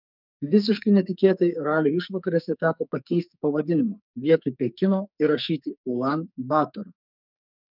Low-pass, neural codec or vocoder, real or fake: 5.4 kHz; codec, 44.1 kHz, 2.6 kbps, SNAC; fake